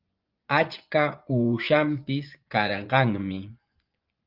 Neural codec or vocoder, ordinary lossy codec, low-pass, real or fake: vocoder, 24 kHz, 100 mel bands, Vocos; Opus, 32 kbps; 5.4 kHz; fake